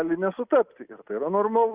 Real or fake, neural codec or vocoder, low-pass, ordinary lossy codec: real; none; 3.6 kHz; Opus, 64 kbps